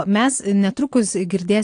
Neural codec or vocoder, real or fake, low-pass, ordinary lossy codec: none; real; 9.9 kHz; AAC, 48 kbps